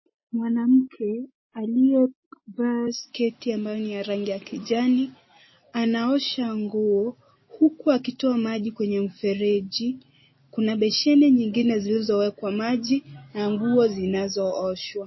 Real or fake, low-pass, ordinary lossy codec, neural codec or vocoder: real; 7.2 kHz; MP3, 24 kbps; none